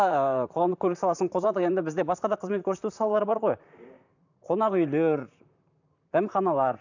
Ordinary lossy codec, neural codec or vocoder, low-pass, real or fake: none; vocoder, 44.1 kHz, 128 mel bands, Pupu-Vocoder; 7.2 kHz; fake